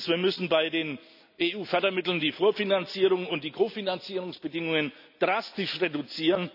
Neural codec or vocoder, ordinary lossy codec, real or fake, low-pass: none; none; real; 5.4 kHz